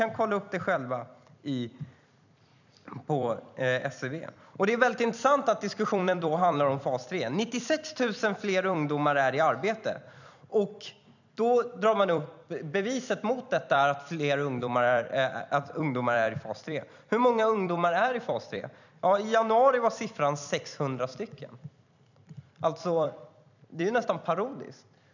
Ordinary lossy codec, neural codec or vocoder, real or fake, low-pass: none; vocoder, 44.1 kHz, 128 mel bands every 256 samples, BigVGAN v2; fake; 7.2 kHz